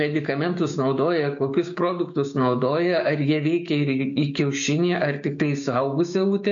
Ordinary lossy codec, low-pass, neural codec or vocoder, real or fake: AAC, 64 kbps; 7.2 kHz; codec, 16 kHz, 6 kbps, DAC; fake